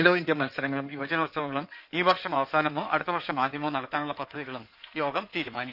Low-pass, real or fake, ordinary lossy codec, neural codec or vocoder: 5.4 kHz; fake; none; codec, 16 kHz in and 24 kHz out, 2.2 kbps, FireRedTTS-2 codec